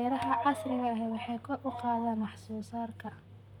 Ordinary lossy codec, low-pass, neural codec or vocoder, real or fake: none; 19.8 kHz; vocoder, 44.1 kHz, 128 mel bands, Pupu-Vocoder; fake